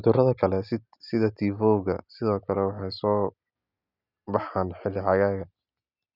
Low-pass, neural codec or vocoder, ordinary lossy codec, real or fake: 5.4 kHz; none; none; real